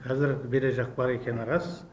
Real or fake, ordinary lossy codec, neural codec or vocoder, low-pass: real; none; none; none